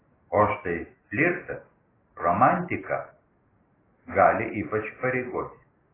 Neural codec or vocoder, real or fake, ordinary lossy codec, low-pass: none; real; AAC, 16 kbps; 3.6 kHz